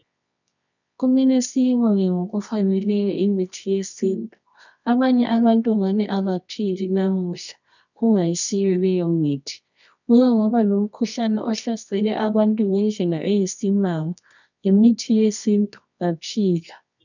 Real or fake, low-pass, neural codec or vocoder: fake; 7.2 kHz; codec, 24 kHz, 0.9 kbps, WavTokenizer, medium music audio release